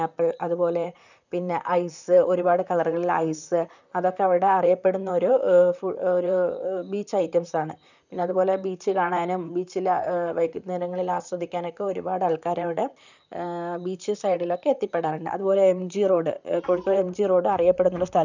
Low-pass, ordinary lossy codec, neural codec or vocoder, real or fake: 7.2 kHz; none; vocoder, 44.1 kHz, 128 mel bands, Pupu-Vocoder; fake